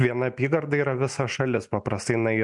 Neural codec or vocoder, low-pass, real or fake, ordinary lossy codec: none; 10.8 kHz; real; MP3, 64 kbps